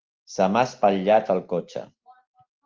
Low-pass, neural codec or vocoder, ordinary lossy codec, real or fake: 7.2 kHz; none; Opus, 32 kbps; real